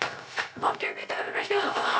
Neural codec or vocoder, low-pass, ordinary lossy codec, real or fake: codec, 16 kHz, 0.3 kbps, FocalCodec; none; none; fake